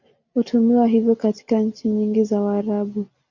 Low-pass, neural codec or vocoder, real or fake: 7.2 kHz; none; real